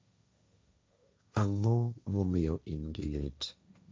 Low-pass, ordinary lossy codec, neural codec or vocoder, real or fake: none; none; codec, 16 kHz, 1.1 kbps, Voila-Tokenizer; fake